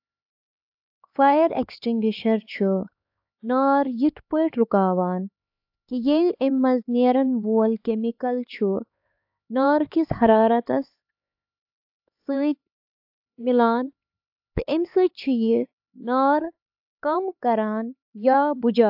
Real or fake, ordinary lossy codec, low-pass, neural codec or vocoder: fake; AAC, 48 kbps; 5.4 kHz; codec, 16 kHz, 4 kbps, X-Codec, HuBERT features, trained on LibriSpeech